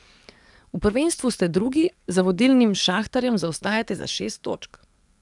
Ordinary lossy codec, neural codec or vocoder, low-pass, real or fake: none; codec, 44.1 kHz, 7.8 kbps, DAC; 10.8 kHz; fake